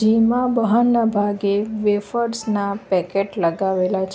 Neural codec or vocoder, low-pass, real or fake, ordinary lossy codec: none; none; real; none